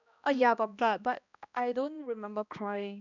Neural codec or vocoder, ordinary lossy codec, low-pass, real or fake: codec, 16 kHz, 1 kbps, X-Codec, HuBERT features, trained on balanced general audio; none; 7.2 kHz; fake